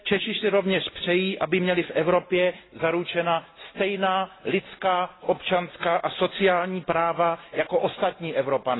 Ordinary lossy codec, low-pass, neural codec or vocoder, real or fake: AAC, 16 kbps; 7.2 kHz; none; real